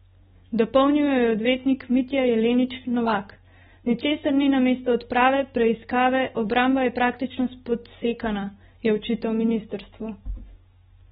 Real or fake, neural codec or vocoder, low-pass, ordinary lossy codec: real; none; 19.8 kHz; AAC, 16 kbps